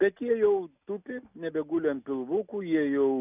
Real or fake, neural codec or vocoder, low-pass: real; none; 3.6 kHz